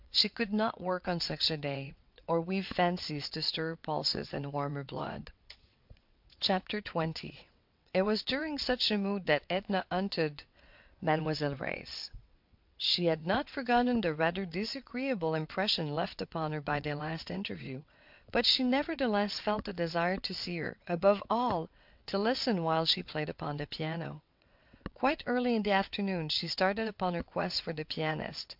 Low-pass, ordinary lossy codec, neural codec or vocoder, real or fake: 5.4 kHz; MP3, 48 kbps; vocoder, 22.05 kHz, 80 mel bands, WaveNeXt; fake